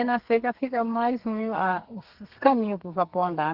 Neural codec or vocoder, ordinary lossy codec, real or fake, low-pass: codec, 32 kHz, 1.9 kbps, SNAC; Opus, 16 kbps; fake; 5.4 kHz